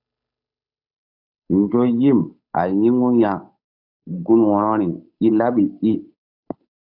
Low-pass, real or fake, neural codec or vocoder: 5.4 kHz; fake; codec, 16 kHz, 8 kbps, FunCodec, trained on Chinese and English, 25 frames a second